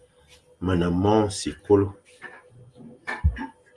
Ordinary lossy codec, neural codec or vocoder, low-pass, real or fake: Opus, 32 kbps; none; 10.8 kHz; real